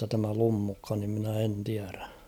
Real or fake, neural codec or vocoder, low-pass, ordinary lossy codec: real; none; none; none